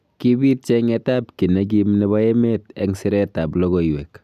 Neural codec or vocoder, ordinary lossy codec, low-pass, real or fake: none; none; 14.4 kHz; real